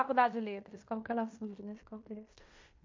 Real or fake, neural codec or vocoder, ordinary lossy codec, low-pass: fake; codec, 16 kHz in and 24 kHz out, 0.9 kbps, LongCat-Audio-Codec, fine tuned four codebook decoder; MP3, 48 kbps; 7.2 kHz